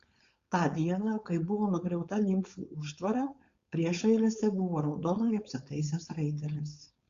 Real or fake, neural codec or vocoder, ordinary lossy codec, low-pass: fake; codec, 16 kHz, 4.8 kbps, FACodec; Opus, 64 kbps; 7.2 kHz